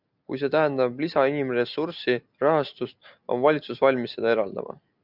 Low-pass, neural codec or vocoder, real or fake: 5.4 kHz; none; real